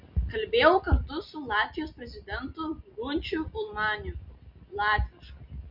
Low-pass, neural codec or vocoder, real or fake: 5.4 kHz; none; real